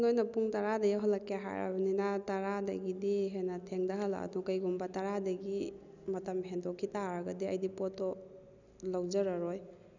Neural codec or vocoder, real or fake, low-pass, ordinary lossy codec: none; real; 7.2 kHz; none